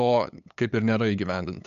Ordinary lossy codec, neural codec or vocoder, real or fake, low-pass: MP3, 96 kbps; codec, 16 kHz, 8 kbps, FunCodec, trained on LibriTTS, 25 frames a second; fake; 7.2 kHz